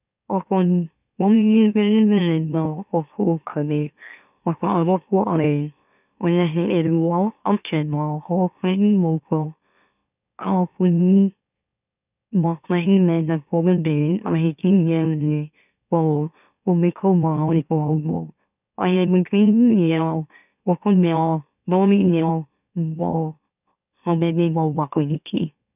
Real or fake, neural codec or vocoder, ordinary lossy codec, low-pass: fake; autoencoder, 44.1 kHz, a latent of 192 numbers a frame, MeloTTS; none; 3.6 kHz